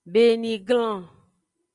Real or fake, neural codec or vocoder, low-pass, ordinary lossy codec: real; none; 10.8 kHz; Opus, 32 kbps